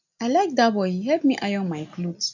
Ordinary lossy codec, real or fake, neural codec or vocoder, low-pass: none; real; none; 7.2 kHz